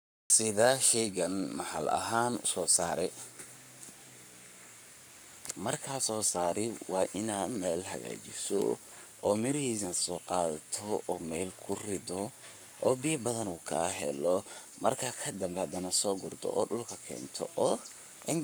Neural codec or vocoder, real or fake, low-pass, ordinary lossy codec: codec, 44.1 kHz, 7.8 kbps, Pupu-Codec; fake; none; none